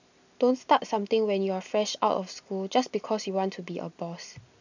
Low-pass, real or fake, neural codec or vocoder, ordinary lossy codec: 7.2 kHz; real; none; none